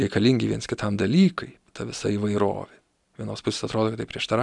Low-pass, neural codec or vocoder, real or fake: 10.8 kHz; none; real